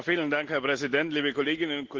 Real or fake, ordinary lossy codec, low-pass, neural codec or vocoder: real; Opus, 16 kbps; 7.2 kHz; none